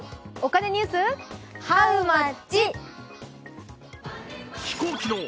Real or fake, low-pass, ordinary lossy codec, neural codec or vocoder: real; none; none; none